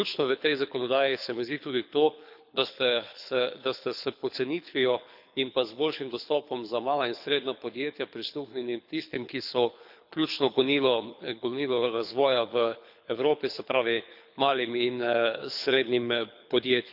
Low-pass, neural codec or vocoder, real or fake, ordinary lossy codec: 5.4 kHz; codec, 24 kHz, 6 kbps, HILCodec; fake; none